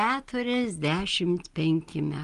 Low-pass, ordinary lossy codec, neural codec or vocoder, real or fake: 10.8 kHz; Opus, 24 kbps; none; real